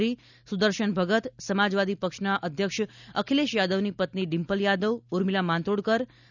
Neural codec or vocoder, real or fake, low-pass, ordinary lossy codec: none; real; 7.2 kHz; none